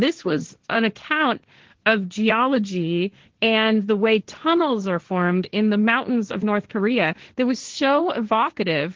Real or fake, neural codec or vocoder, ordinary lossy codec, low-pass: fake; codec, 16 kHz, 1.1 kbps, Voila-Tokenizer; Opus, 16 kbps; 7.2 kHz